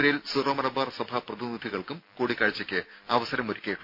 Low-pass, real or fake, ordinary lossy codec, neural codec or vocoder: 5.4 kHz; real; MP3, 48 kbps; none